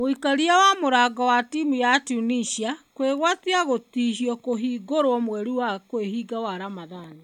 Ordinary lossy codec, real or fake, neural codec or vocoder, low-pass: none; real; none; 19.8 kHz